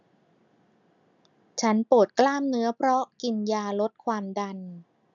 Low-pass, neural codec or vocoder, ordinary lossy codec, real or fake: 7.2 kHz; none; none; real